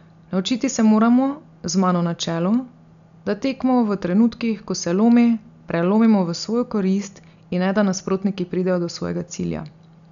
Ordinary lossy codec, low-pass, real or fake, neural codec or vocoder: none; 7.2 kHz; real; none